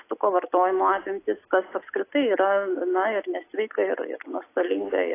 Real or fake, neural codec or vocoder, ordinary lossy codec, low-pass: real; none; AAC, 24 kbps; 3.6 kHz